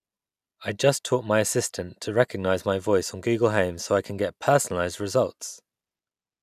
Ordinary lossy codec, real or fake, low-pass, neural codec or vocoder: none; real; 14.4 kHz; none